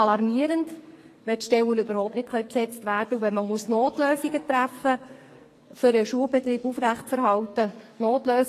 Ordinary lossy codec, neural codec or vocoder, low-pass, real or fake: AAC, 48 kbps; codec, 44.1 kHz, 2.6 kbps, SNAC; 14.4 kHz; fake